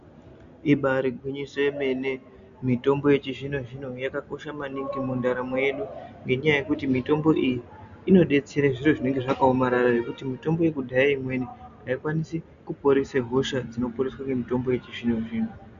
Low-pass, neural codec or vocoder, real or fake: 7.2 kHz; none; real